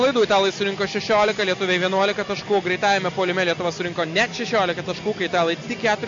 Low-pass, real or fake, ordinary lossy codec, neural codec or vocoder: 7.2 kHz; real; MP3, 48 kbps; none